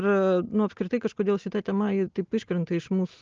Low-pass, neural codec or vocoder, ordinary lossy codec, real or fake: 7.2 kHz; none; Opus, 24 kbps; real